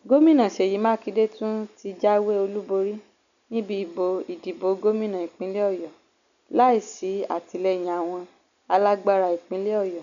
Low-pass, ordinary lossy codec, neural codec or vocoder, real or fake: 7.2 kHz; none; none; real